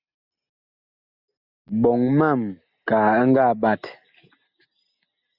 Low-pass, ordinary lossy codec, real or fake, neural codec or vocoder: 5.4 kHz; Opus, 64 kbps; real; none